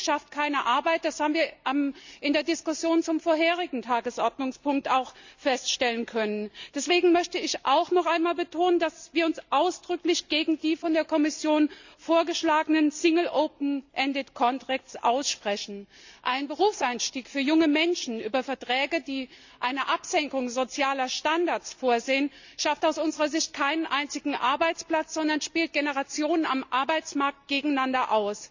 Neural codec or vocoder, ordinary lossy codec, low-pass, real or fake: none; Opus, 64 kbps; 7.2 kHz; real